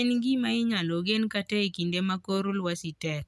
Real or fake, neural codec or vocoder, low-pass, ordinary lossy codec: real; none; none; none